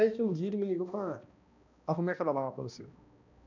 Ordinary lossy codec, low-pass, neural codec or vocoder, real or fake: none; 7.2 kHz; codec, 16 kHz, 1 kbps, X-Codec, HuBERT features, trained on balanced general audio; fake